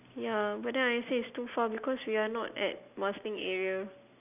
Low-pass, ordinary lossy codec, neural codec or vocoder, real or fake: 3.6 kHz; none; none; real